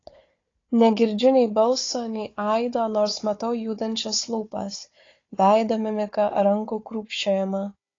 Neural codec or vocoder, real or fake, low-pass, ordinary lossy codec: codec, 16 kHz, 4 kbps, FunCodec, trained on Chinese and English, 50 frames a second; fake; 7.2 kHz; AAC, 32 kbps